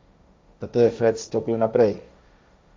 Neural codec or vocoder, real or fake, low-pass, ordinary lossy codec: codec, 16 kHz, 1.1 kbps, Voila-Tokenizer; fake; none; none